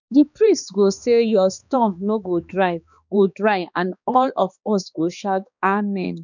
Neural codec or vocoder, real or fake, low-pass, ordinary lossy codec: codec, 16 kHz, 2 kbps, X-Codec, HuBERT features, trained on balanced general audio; fake; 7.2 kHz; none